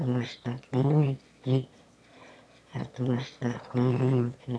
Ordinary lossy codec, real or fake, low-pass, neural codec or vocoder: none; fake; none; autoencoder, 22.05 kHz, a latent of 192 numbers a frame, VITS, trained on one speaker